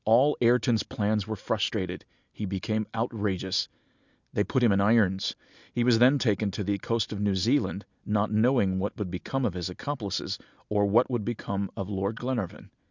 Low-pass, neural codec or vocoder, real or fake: 7.2 kHz; none; real